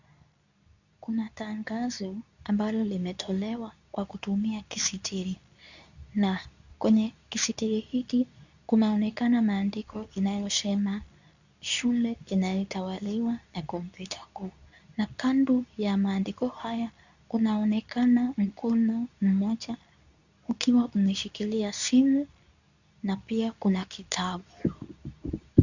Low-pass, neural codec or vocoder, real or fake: 7.2 kHz; codec, 24 kHz, 0.9 kbps, WavTokenizer, medium speech release version 2; fake